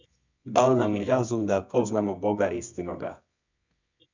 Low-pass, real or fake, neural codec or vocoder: 7.2 kHz; fake; codec, 24 kHz, 0.9 kbps, WavTokenizer, medium music audio release